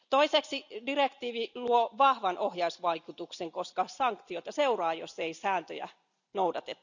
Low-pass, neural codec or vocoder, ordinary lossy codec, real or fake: 7.2 kHz; none; none; real